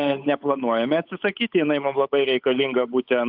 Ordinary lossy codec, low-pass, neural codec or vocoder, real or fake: AAC, 48 kbps; 5.4 kHz; codec, 16 kHz, 8 kbps, FunCodec, trained on Chinese and English, 25 frames a second; fake